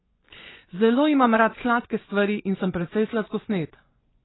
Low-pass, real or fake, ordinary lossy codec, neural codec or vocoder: 7.2 kHz; fake; AAC, 16 kbps; vocoder, 24 kHz, 100 mel bands, Vocos